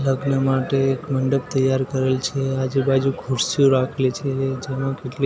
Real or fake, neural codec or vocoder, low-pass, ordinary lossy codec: real; none; none; none